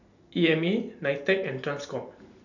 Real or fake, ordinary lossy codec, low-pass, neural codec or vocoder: real; none; 7.2 kHz; none